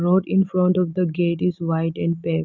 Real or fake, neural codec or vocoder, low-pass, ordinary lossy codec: real; none; 7.2 kHz; none